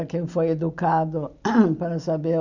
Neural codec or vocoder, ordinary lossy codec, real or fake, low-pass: none; Opus, 64 kbps; real; 7.2 kHz